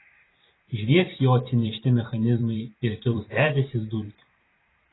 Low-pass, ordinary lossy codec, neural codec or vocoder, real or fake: 7.2 kHz; AAC, 16 kbps; vocoder, 44.1 kHz, 128 mel bands every 512 samples, BigVGAN v2; fake